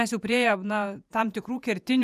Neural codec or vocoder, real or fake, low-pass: vocoder, 48 kHz, 128 mel bands, Vocos; fake; 14.4 kHz